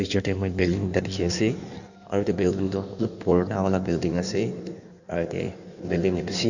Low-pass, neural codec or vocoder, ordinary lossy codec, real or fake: 7.2 kHz; codec, 16 kHz in and 24 kHz out, 1.1 kbps, FireRedTTS-2 codec; none; fake